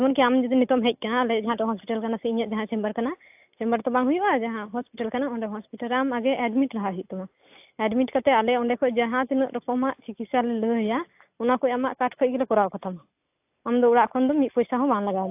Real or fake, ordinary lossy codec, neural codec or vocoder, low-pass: real; AAC, 32 kbps; none; 3.6 kHz